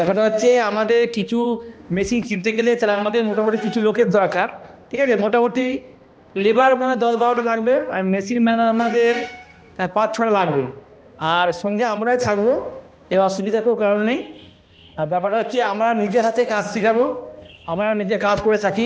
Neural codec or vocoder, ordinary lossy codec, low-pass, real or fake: codec, 16 kHz, 1 kbps, X-Codec, HuBERT features, trained on balanced general audio; none; none; fake